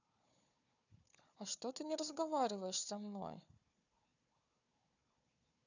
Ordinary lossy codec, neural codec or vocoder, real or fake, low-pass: none; codec, 16 kHz, 4 kbps, FunCodec, trained on Chinese and English, 50 frames a second; fake; 7.2 kHz